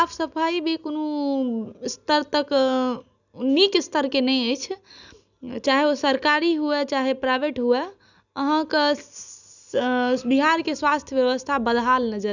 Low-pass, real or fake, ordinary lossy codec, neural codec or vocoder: 7.2 kHz; real; none; none